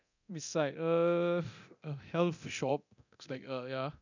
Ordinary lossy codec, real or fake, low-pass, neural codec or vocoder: none; fake; 7.2 kHz; codec, 24 kHz, 0.9 kbps, DualCodec